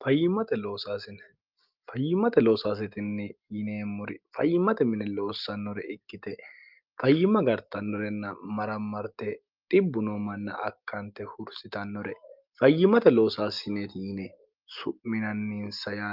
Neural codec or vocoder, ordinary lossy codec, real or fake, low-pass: none; Opus, 24 kbps; real; 5.4 kHz